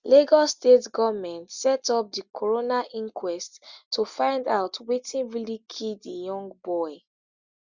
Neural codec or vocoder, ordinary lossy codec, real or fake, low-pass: none; Opus, 64 kbps; real; 7.2 kHz